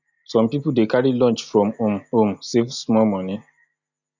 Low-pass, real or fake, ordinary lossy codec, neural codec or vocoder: 7.2 kHz; real; none; none